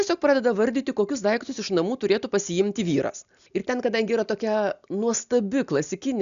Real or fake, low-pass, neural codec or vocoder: real; 7.2 kHz; none